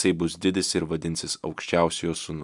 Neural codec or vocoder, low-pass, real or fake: none; 10.8 kHz; real